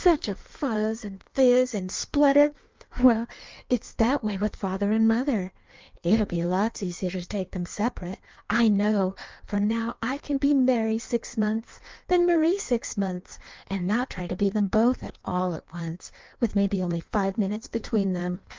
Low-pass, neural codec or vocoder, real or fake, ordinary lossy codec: 7.2 kHz; codec, 16 kHz in and 24 kHz out, 1.1 kbps, FireRedTTS-2 codec; fake; Opus, 24 kbps